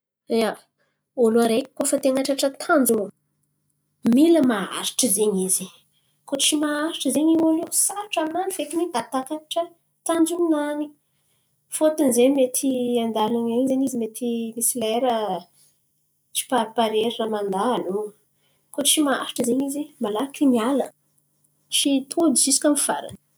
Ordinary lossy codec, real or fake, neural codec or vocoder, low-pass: none; real; none; none